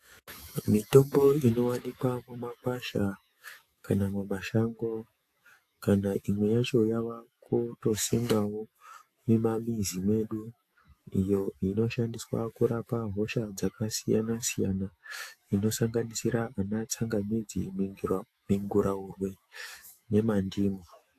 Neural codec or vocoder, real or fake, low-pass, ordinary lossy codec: autoencoder, 48 kHz, 128 numbers a frame, DAC-VAE, trained on Japanese speech; fake; 14.4 kHz; AAC, 64 kbps